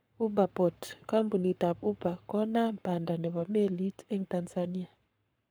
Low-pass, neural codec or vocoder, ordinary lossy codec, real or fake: none; codec, 44.1 kHz, 7.8 kbps, Pupu-Codec; none; fake